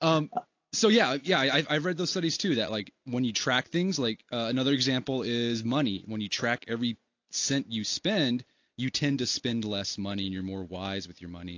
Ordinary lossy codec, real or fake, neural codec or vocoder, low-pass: AAC, 48 kbps; real; none; 7.2 kHz